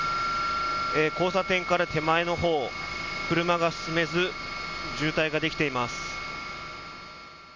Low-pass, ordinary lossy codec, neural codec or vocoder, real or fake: 7.2 kHz; MP3, 48 kbps; none; real